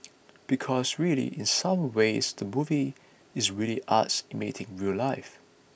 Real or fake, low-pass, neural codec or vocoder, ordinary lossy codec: real; none; none; none